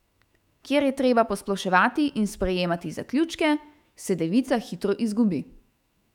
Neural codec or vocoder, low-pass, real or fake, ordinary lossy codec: autoencoder, 48 kHz, 128 numbers a frame, DAC-VAE, trained on Japanese speech; 19.8 kHz; fake; none